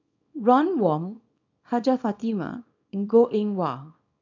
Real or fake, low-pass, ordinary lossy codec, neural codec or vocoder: fake; 7.2 kHz; MP3, 64 kbps; codec, 24 kHz, 0.9 kbps, WavTokenizer, small release